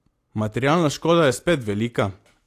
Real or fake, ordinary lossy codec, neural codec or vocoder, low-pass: real; AAC, 64 kbps; none; 14.4 kHz